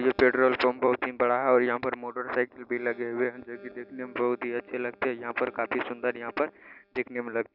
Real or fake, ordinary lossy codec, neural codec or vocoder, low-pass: real; none; none; 5.4 kHz